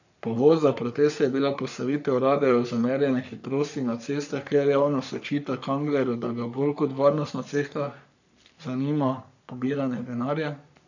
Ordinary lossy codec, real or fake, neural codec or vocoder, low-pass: none; fake; codec, 44.1 kHz, 3.4 kbps, Pupu-Codec; 7.2 kHz